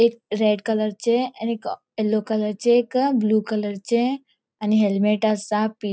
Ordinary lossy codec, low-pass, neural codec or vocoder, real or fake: none; none; none; real